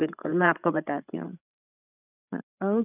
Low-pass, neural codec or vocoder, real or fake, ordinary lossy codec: 3.6 kHz; codec, 16 kHz, 16 kbps, FreqCodec, larger model; fake; none